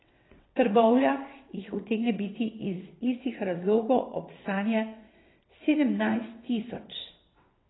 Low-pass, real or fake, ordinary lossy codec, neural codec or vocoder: 7.2 kHz; fake; AAC, 16 kbps; vocoder, 22.05 kHz, 80 mel bands, WaveNeXt